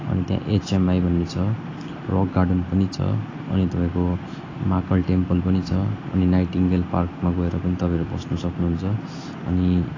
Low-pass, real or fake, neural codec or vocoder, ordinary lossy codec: 7.2 kHz; real; none; AAC, 32 kbps